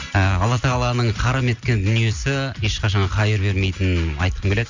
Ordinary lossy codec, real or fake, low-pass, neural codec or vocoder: Opus, 64 kbps; real; 7.2 kHz; none